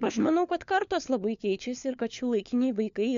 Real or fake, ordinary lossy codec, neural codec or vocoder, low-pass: fake; MP3, 64 kbps; codec, 16 kHz, 4 kbps, FunCodec, trained on LibriTTS, 50 frames a second; 7.2 kHz